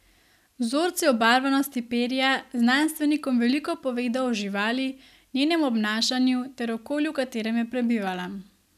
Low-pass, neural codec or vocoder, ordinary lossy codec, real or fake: 14.4 kHz; none; none; real